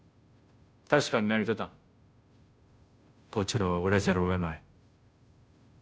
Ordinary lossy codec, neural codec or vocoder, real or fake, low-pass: none; codec, 16 kHz, 0.5 kbps, FunCodec, trained on Chinese and English, 25 frames a second; fake; none